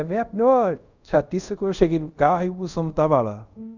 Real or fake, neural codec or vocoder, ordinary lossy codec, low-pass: fake; codec, 24 kHz, 0.5 kbps, DualCodec; none; 7.2 kHz